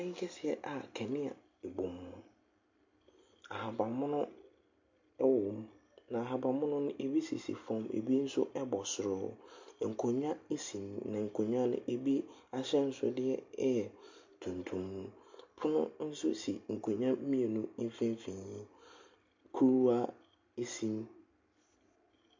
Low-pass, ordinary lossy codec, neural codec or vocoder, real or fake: 7.2 kHz; MP3, 48 kbps; none; real